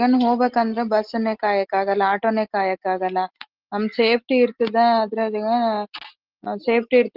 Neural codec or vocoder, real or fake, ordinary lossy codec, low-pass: none; real; Opus, 16 kbps; 5.4 kHz